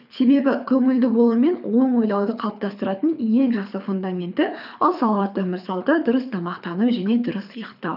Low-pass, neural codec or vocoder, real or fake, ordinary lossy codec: 5.4 kHz; codec, 24 kHz, 6 kbps, HILCodec; fake; none